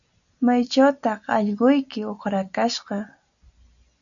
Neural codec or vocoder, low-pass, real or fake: none; 7.2 kHz; real